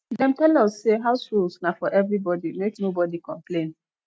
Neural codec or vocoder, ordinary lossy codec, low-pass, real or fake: none; none; none; real